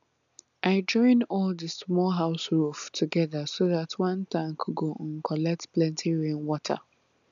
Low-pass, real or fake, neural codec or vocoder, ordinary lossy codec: 7.2 kHz; real; none; MP3, 96 kbps